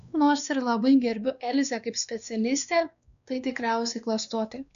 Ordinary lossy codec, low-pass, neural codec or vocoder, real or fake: AAC, 96 kbps; 7.2 kHz; codec, 16 kHz, 2 kbps, X-Codec, WavLM features, trained on Multilingual LibriSpeech; fake